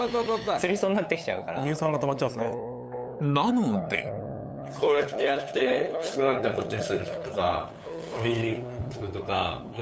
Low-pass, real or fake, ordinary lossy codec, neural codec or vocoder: none; fake; none; codec, 16 kHz, 8 kbps, FunCodec, trained on LibriTTS, 25 frames a second